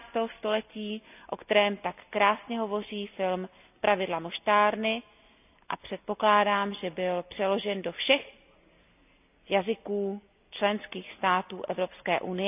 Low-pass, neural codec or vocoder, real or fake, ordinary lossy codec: 3.6 kHz; none; real; none